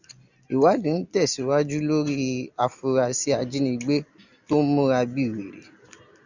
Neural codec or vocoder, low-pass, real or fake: none; 7.2 kHz; real